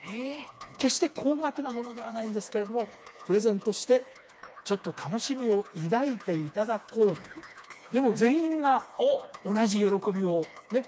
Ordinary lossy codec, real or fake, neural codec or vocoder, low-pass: none; fake; codec, 16 kHz, 2 kbps, FreqCodec, smaller model; none